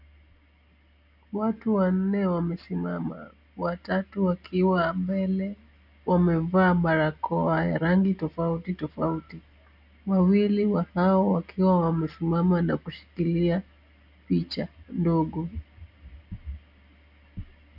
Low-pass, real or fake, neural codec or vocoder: 5.4 kHz; real; none